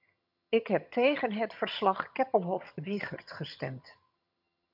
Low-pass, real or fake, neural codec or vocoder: 5.4 kHz; fake; vocoder, 22.05 kHz, 80 mel bands, HiFi-GAN